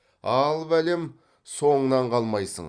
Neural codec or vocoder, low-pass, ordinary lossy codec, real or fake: vocoder, 44.1 kHz, 128 mel bands every 256 samples, BigVGAN v2; 9.9 kHz; Opus, 64 kbps; fake